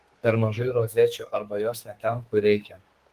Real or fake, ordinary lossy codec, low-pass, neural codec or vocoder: fake; Opus, 24 kbps; 14.4 kHz; codec, 32 kHz, 1.9 kbps, SNAC